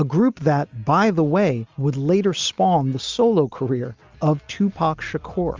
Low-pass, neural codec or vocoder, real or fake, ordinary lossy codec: 7.2 kHz; none; real; Opus, 32 kbps